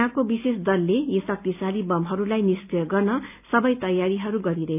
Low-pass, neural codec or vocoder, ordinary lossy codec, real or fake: 3.6 kHz; none; none; real